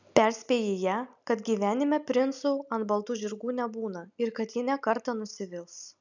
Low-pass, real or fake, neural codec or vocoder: 7.2 kHz; real; none